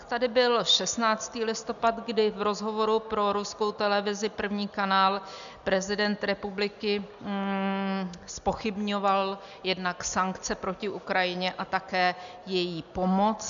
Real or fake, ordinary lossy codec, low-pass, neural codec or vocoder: real; MP3, 96 kbps; 7.2 kHz; none